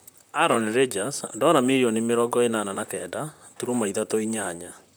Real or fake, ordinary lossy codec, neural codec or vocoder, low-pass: fake; none; vocoder, 44.1 kHz, 128 mel bands, Pupu-Vocoder; none